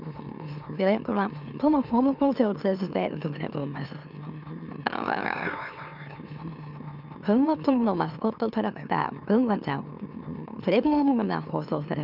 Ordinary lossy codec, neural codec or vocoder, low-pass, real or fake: none; autoencoder, 44.1 kHz, a latent of 192 numbers a frame, MeloTTS; 5.4 kHz; fake